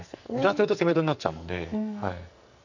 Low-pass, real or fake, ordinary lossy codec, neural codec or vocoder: 7.2 kHz; fake; none; codec, 44.1 kHz, 2.6 kbps, SNAC